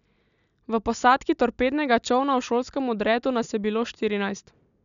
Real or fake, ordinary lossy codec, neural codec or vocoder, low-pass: real; none; none; 7.2 kHz